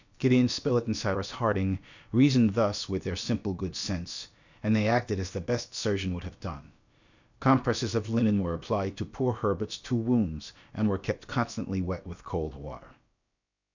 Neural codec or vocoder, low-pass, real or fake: codec, 16 kHz, about 1 kbps, DyCAST, with the encoder's durations; 7.2 kHz; fake